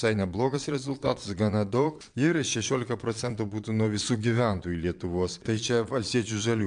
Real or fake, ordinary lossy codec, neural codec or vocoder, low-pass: fake; MP3, 64 kbps; vocoder, 22.05 kHz, 80 mel bands, Vocos; 9.9 kHz